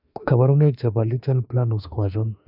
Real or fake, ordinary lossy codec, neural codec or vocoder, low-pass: fake; none; autoencoder, 48 kHz, 32 numbers a frame, DAC-VAE, trained on Japanese speech; 5.4 kHz